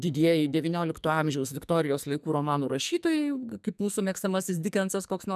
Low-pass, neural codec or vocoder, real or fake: 14.4 kHz; codec, 44.1 kHz, 2.6 kbps, SNAC; fake